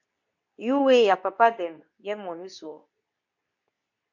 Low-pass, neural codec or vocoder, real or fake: 7.2 kHz; codec, 24 kHz, 0.9 kbps, WavTokenizer, medium speech release version 2; fake